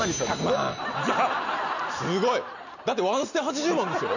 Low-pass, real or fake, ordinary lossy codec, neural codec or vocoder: 7.2 kHz; real; none; none